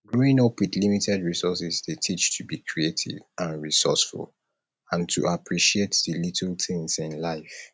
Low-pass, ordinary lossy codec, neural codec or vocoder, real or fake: none; none; none; real